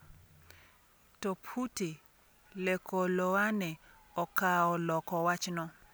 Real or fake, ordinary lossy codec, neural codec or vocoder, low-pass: real; none; none; none